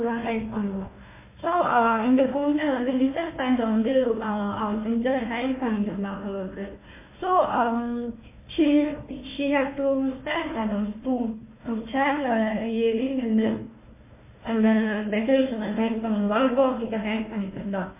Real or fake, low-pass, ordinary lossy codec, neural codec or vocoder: fake; 3.6 kHz; MP3, 24 kbps; codec, 16 kHz, 1 kbps, FunCodec, trained on Chinese and English, 50 frames a second